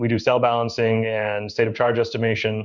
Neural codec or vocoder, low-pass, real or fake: codec, 16 kHz in and 24 kHz out, 1 kbps, XY-Tokenizer; 7.2 kHz; fake